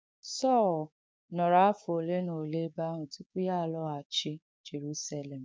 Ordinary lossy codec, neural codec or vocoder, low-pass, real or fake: none; codec, 16 kHz, 6 kbps, DAC; none; fake